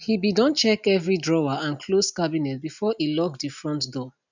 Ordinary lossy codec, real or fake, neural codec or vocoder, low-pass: none; real; none; 7.2 kHz